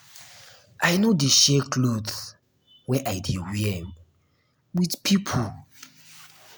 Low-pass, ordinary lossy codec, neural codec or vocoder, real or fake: none; none; none; real